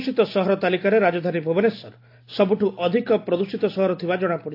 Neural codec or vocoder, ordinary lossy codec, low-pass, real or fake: none; AAC, 32 kbps; 5.4 kHz; real